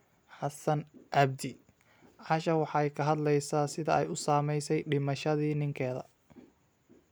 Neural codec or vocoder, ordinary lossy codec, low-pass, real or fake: none; none; none; real